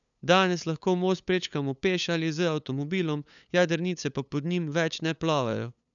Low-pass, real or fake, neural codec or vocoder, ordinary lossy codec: 7.2 kHz; fake; codec, 16 kHz, 8 kbps, FunCodec, trained on LibriTTS, 25 frames a second; none